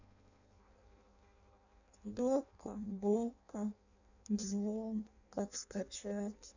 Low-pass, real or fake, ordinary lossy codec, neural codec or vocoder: 7.2 kHz; fake; none; codec, 16 kHz in and 24 kHz out, 0.6 kbps, FireRedTTS-2 codec